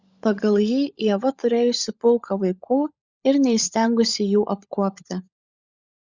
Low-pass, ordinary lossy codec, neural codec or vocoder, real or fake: 7.2 kHz; Opus, 64 kbps; codec, 16 kHz, 16 kbps, FunCodec, trained on LibriTTS, 50 frames a second; fake